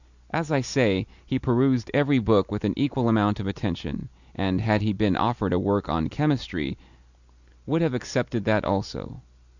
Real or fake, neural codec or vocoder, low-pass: real; none; 7.2 kHz